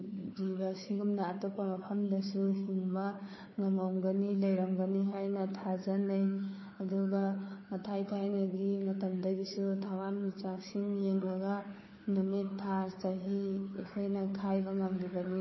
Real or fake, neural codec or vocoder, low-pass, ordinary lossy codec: fake; codec, 16 kHz, 4 kbps, FunCodec, trained on Chinese and English, 50 frames a second; 7.2 kHz; MP3, 24 kbps